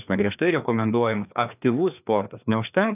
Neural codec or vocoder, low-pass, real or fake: codec, 16 kHz, 2 kbps, FreqCodec, larger model; 3.6 kHz; fake